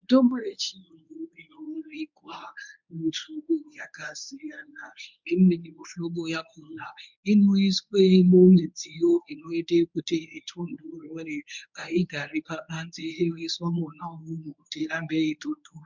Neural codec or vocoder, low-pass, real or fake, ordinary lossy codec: codec, 24 kHz, 0.9 kbps, WavTokenizer, medium speech release version 1; 7.2 kHz; fake; MP3, 64 kbps